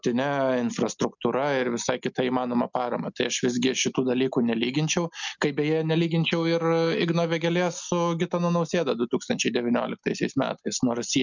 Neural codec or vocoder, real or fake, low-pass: none; real; 7.2 kHz